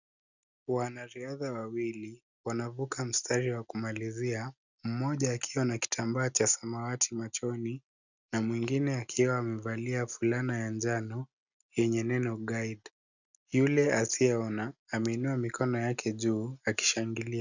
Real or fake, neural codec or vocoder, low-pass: real; none; 7.2 kHz